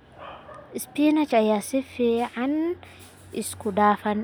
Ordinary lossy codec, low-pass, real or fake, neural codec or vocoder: none; none; real; none